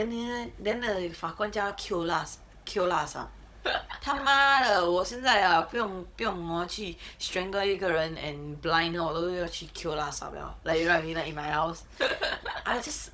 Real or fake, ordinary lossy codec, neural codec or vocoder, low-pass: fake; none; codec, 16 kHz, 16 kbps, FunCodec, trained on Chinese and English, 50 frames a second; none